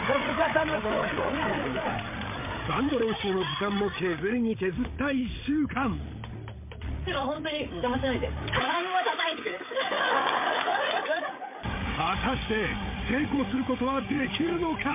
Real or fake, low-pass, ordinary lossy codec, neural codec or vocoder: fake; 3.6 kHz; MP3, 24 kbps; codec, 16 kHz, 8 kbps, FreqCodec, larger model